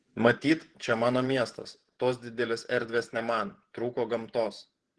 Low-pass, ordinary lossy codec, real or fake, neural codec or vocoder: 10.8 kHz; Opus, 16 kbps; fake; vocoder, 48 kHz, 128 mel bands, Vocos